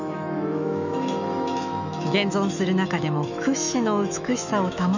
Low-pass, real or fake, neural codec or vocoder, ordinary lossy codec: 7.2 kHz; fake; autoencoder, 48 kHz, 128 numbers a frame, DAC-VAE, trained on Japanese speech; none